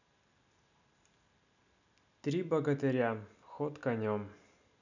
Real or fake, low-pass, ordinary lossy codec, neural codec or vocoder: real; 7.2 kHz; none; none